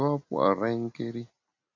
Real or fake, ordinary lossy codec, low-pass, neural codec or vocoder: real; MP3, 48 kbps; 7.2 kHz; none